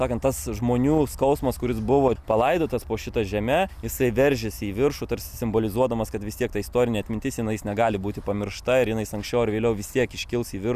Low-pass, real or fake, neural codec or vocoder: 14.4 kHz; real; none